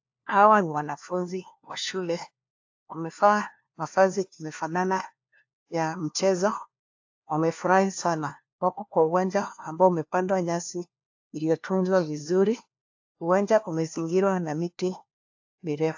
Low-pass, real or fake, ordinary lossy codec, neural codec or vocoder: 7.2 kHz; fake; AAC, 48 kbps; codec, 16 kHz, 1 kbps, FunCodec, trained on LibriTTS, 50 frames a second